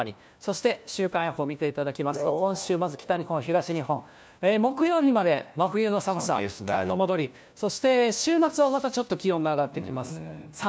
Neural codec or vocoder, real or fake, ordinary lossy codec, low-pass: codec, 16 kHz, 1 kbps, FunCodec, trained on LibriTTS, 50 frames a second; fake; none; none